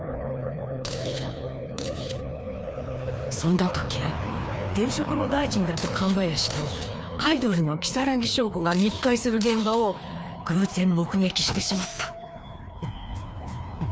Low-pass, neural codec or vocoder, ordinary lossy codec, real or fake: none; codec, 16 kHz, 2 kbps, FreqCodec, larger model; none; fake